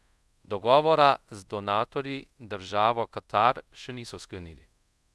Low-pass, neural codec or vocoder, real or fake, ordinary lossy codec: none; codec, 24 kHz, 0.5 kbps, DualCodec; fake; none